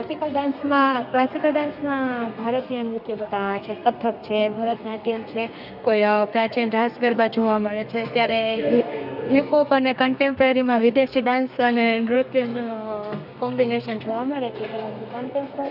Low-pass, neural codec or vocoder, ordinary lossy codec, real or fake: 5.4 kHz; codec, 32 kHz, 1.9 kbps, SNAC; none; fake